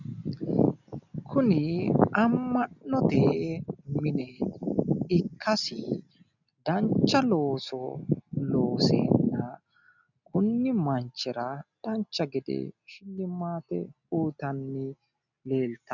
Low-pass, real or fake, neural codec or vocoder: 7.2 kHz; real; none